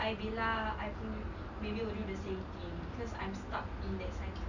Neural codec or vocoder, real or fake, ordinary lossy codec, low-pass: none; real; none; 7.2 kHz